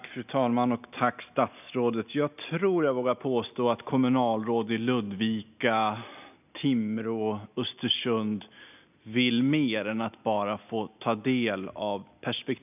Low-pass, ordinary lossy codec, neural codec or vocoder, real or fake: 3.6 kHz; none; none; real